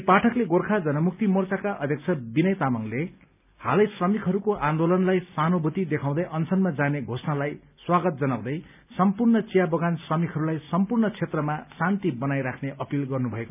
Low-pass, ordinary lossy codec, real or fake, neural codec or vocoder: 3.6 kHz; none; real; none